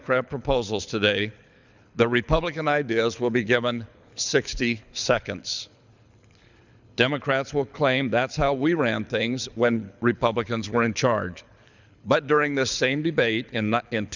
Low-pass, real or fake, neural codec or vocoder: 7.2 kHz; fake; codec, 24 kHz, 6 kbps, HILCodec